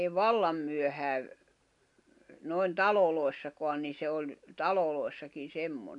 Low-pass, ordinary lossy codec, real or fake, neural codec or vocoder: 10.8 kHz; MP3, 64 kbps; real; none